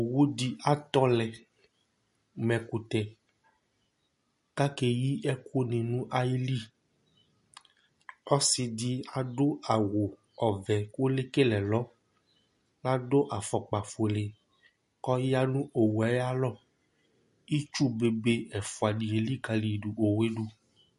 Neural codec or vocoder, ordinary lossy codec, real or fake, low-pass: none; MP3, 48 kbps; real; 14.4 kHz